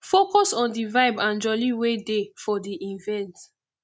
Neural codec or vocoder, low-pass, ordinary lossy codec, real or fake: none; none; none; real